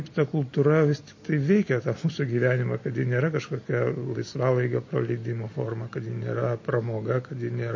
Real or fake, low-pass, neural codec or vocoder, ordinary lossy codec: real; 7.2 kHz; none; MP3, 32 kbps